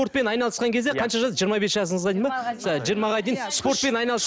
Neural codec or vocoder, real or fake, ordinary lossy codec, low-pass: none; real; none; none